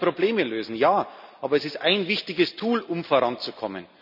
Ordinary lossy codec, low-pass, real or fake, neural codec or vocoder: none; 5.4 kHz; real; none